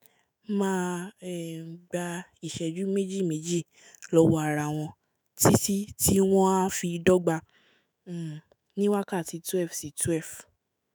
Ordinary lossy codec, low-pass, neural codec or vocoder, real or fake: none; none; autoencoder, 48 kHz, 128 numbers a frame, DAC-VAE, trained on Japanese speech; fake